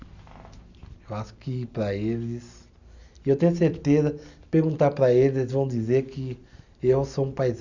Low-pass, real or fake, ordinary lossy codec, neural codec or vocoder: 7.2 kHz; real; none; none